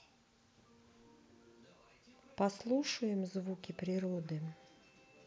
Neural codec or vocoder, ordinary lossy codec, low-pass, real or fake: none; none; none; real